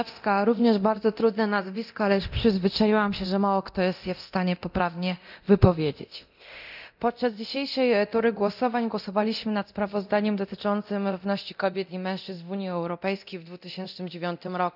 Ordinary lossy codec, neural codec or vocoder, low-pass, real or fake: none; codec, 24 kHz, 0.9 kbps, DualCodec; 5.4 kHz; fake